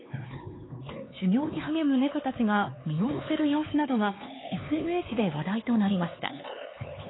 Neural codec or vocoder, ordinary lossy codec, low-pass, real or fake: codec, 16 kHz, 4 kbps, X-Codec, HuBERT features, trained on LibriSpeech; AAC, 16 kbps; 7.2 kHz; fake